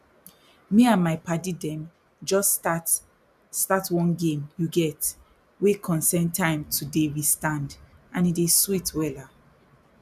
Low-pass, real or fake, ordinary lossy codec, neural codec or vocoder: 14.4 kHz; real; none; none